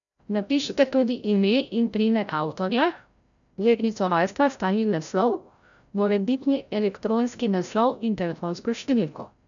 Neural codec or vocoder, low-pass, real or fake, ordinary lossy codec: codec, 16 kHz, 0.5 kbps, FreqCodec, larger model; 7.2 kHz; fake; none